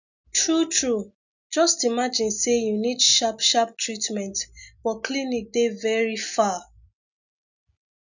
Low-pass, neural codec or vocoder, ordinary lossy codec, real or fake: 7.2 kHz; none; none; real